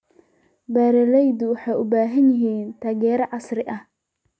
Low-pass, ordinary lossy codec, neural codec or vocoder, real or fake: none; none; none; real